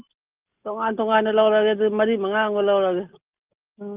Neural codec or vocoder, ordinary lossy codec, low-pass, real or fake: none; Opus, 24 kbps; 3.6 kHz; real